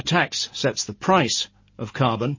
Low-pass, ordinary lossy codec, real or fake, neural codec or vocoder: 7.2 kHz; MP3, 32 kbps; real; none